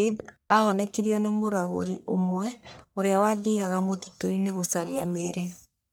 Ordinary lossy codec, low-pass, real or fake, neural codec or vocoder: none; none; fake; codec, 44.1 kHz, 1.7 kbps, Pupu-Codec